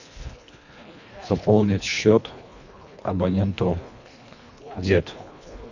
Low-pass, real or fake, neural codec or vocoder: 7.2 kHz; fake; codec, 24 kHz, 1.5 kbps, HILCodec